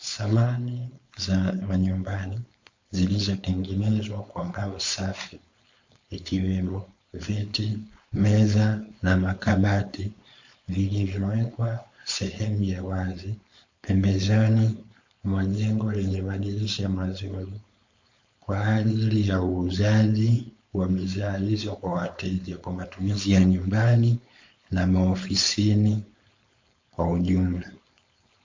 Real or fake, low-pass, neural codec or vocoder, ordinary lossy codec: fake; 7.2 kHz; codec, 16 kHz, 4.8 kbps, FACodec; MP3, 48 kbps